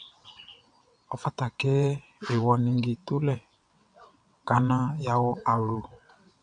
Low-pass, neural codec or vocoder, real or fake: 9.9 kHz; vocoder, 22.05 kHz, 80 mel bands, WaveNeXt; fake